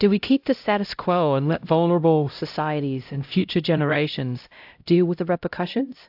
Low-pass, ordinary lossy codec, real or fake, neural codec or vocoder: 5.4 kHz; AAC, 48 kbps; fake; codec, 16 kHz, 0.5 kbps, X-Codec, HuBERT features, trained on LibriSpeech